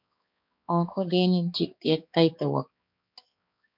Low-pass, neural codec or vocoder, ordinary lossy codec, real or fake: 5.4 kHz; codec, 16 kHz, 2 kbps, X-Codec, HuBERT features, trained on balanced general audio; MP3, 48 kbps; fake